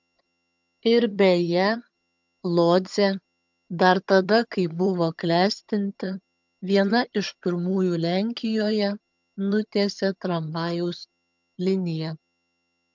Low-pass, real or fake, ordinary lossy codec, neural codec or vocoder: 7.2 kHz; fake; MP3, 64 kbps; vocoder, 22.05 kHz, 80 mel bands, HiFi-GAN